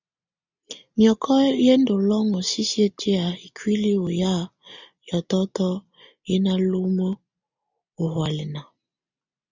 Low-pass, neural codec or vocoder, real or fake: 7.2 kHz; none; real